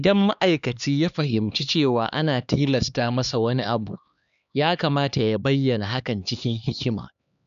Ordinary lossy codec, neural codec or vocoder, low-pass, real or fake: none; codec, 16 kHz, 2 kbps, X-Codec, HuBERT features, trained on LibriSpeech; 7.2 kHz; fake